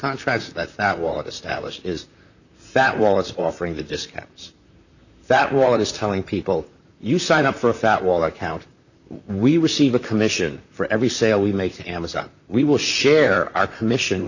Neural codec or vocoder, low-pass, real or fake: vocoder, 44.1 kHz, 128 mel bands, Pupu-Vocoder; 7.2 kHz; fake